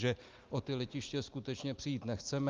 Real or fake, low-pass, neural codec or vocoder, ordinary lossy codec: real; 7.2 kHz; none; Opus, 32 kbps